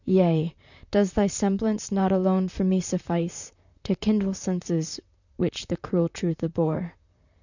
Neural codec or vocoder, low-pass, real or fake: vocoder, 44.1 kHz, 128 mel bands, Pupu-Vocoder; 7.2 kHz; fake